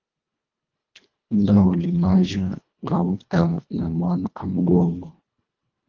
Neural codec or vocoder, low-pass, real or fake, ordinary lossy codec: codec, 24 kHz, 1.5 kbps, HILCodec; 7.2 kHz; fake; Opus, 24 kbps